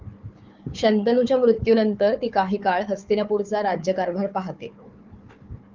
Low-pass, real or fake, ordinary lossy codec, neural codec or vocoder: 7.2 kHz; fake; Opus, 24 kbps; codec, 16 kHz, 4 kbps, FunCodec, trained on Chinese and English, 50 frames a second